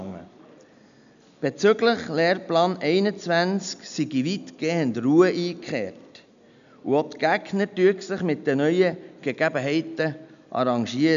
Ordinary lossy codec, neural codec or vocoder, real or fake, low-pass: none; none; real; 7.2 kHz